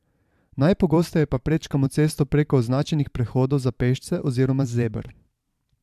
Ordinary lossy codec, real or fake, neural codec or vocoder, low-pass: AAC, 96 kbps; fake; vocoder, 44.1 kHz, 128 mel bands every 512 samples, BigVGAN v2; 14.4 kHz